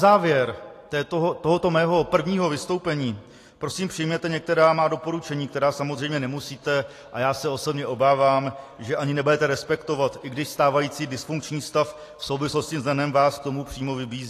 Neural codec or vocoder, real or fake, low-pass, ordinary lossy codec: none; real; 14.4 kHz; AAC, 48 kbps